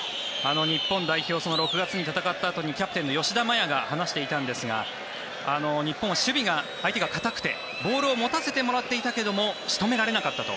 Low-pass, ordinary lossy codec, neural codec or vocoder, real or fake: none; none; none; real